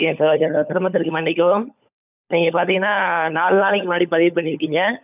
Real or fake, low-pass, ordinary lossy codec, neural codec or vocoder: fake; 3.6 kHz; none; codec, 16 kHz, 16 kbps, FunCodec, trained on LibriTTS, 50 frames a second